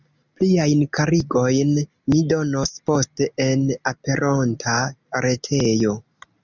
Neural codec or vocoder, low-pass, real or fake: none; 7.2 kHz; real